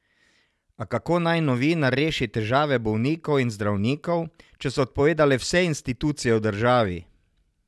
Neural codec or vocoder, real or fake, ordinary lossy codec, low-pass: none; real; none; none